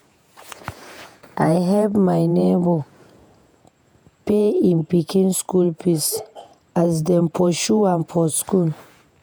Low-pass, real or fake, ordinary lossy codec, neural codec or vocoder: none; fake; none; vocoder, 48 kHz, 128 mel bands, Vocos